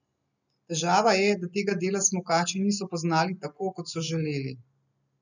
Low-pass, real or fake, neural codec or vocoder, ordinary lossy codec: 7.2 kHz; real; none; none